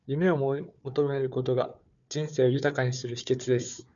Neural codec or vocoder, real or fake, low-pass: codec, 16 kHz, 4 kbps, FunCodec, trained on Chinese and English, 50 frames a second; fake; 7.2 kHz